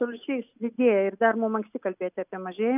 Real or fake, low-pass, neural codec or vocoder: real; 3.6 kHz; none